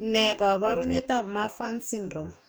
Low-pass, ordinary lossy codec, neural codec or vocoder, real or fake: none; none; codec, 44.1 kHz, 2.6 kbps, DAC; fake